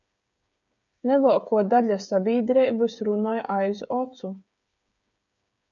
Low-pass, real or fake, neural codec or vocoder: 7.2 kHz; fake; codec, 16 kHz, 8 kbps, FreqCodec, smaller model